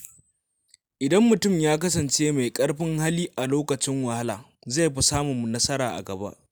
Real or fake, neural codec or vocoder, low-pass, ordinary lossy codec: real; none; none; none